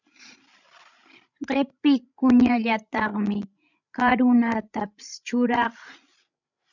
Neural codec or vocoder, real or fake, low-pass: codec, 16 kHz, 16 kbps, FreqCodec, larger model; fake; 7.2 kHz